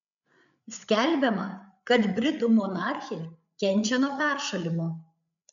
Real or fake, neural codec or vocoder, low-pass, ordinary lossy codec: fake; codec, 16 kHz, 8 kbps, FreqCodec, larger model; 7.2 kHz; MP3, 96 kbps